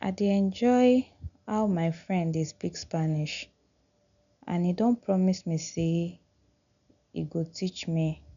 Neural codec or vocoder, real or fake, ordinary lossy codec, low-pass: none; real; none; 7.2 kHz